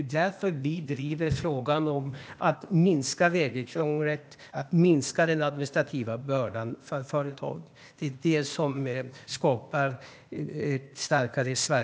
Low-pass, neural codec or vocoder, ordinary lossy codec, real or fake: none; codec, 16 kHz, 0.8 kbps, ZipCodec; none; fake